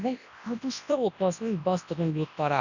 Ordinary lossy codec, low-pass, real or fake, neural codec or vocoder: none; 7.2 kHz; fake; codec, 24 kHz, 0.9 kbps, WavTokenizer, large speech release